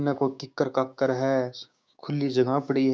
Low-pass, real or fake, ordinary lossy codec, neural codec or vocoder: none; fake; none; codec, 16 kHz, 6 kbps, DAC